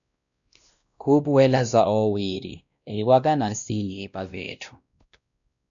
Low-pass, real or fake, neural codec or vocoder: 7.2 kHz; fake; codec, 16 kHz, 1 kbps, X-Codec, WavLM features, trained on Multilingual LibriSpeech